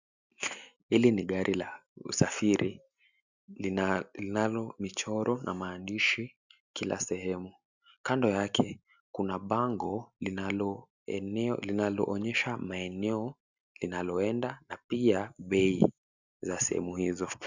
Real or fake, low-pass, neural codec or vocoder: real; 7.2 kHz; none